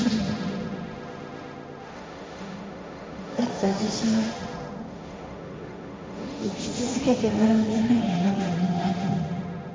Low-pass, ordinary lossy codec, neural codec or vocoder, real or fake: none; none; codec, 16 kHz, 1.1 kbps, Voila-Tokenizer; fake